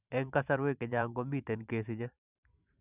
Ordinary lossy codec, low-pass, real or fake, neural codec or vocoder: none; 3.6 kHz; fake; vocoder, 44.1 kHz, 128 mel bands every 512 samples, BigVGAN v2